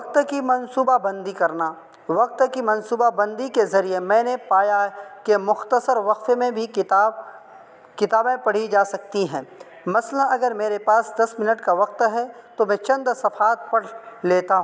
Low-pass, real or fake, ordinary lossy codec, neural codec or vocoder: none; real; none; none